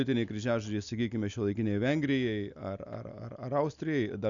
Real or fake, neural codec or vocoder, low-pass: real; none; 7.2 kHz